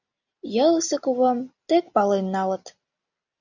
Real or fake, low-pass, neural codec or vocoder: real; 7.2 kHz; none